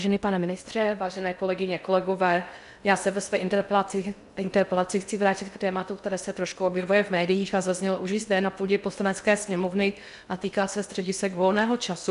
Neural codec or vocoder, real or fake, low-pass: codec, 16 kHz in and 24 kHz out, 0.6 kbps, FocalCodec, streaming, 2048 codes; fake; 10.8 kHz